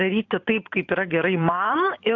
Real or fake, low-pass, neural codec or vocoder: fake; 7.2 kHz; vocoder, 44.1 kHz, 128 mel bands every 512 samples, BigVGAN v2